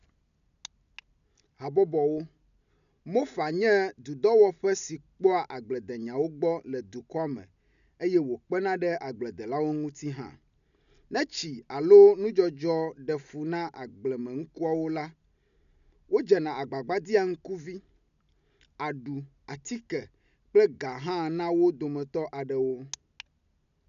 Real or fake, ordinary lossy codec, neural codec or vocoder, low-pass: real; none; none; 7.2 kHz